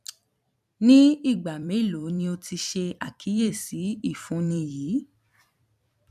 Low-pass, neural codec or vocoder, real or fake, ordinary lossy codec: 14.4 kHz; vocoder, 44.1 kHz, 128 mel bands every 256 samples, BigVGAN v2; fake; none